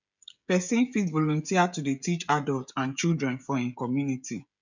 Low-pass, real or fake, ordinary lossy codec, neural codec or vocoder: 7.2 kHz; fake; none; codec, 16 kHz, 16 kbps, FreqCodec, smaller model